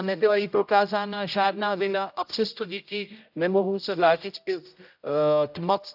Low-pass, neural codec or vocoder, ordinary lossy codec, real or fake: 5.4 kHz; codec, 16 kHz, 0.5 kbps, X-Codec, HuBERT features, trained on general audio; AAC, 48 kbps; fake